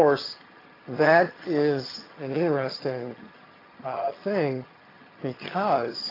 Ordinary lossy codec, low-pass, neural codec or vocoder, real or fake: AAC, 24 kbps; 5.4 kHz; vocoder, 22.05 kHz, 80 mel bands, HiFi-GAN; fake